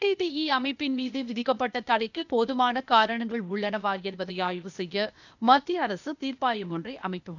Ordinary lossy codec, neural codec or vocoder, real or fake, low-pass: none; codec, 16 kHz, 0.8 kbps, ZipCodec; fake; 7.2 kHz